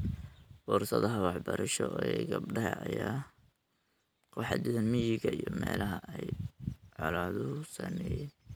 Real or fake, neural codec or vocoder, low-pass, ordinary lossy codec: real; none; none; none